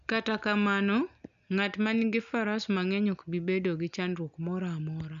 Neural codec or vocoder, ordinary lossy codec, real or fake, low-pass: none; none; real; 7.2 kHz